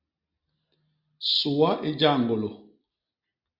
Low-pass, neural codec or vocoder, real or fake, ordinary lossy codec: 5.4 kHz; none; real; Opus, 64 kbps